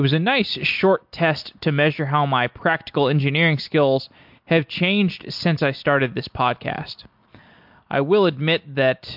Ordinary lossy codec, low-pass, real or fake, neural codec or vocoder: MP3, 48 kbps; 5.4 kHz; real; none